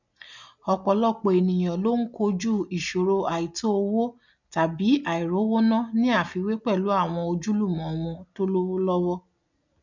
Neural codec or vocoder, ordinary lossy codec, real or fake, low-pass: none; none; real; 7.2 kHz